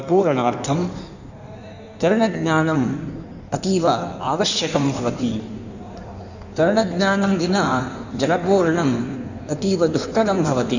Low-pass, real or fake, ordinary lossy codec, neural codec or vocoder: 7.2 kHz; fake; none; codec, 16 kHz in and 24 kHz out, 1.1 kbps, FireRedTTS-2 codec